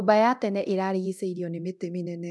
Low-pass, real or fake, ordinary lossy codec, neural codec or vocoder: none; fake; none; codec, 24 kHz, 0.9 kbps, DualCodec